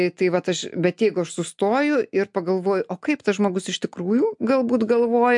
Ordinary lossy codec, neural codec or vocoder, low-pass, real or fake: MP3, 64 kbps; none; 10.8 kHz; real